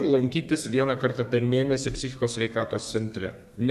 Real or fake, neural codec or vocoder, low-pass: fake; codec, 32 kHz, 1.9 kbps, SNAC; 14.4 kHz